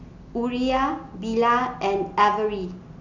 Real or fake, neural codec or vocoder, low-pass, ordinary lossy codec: real; none; 7.2 kHz; none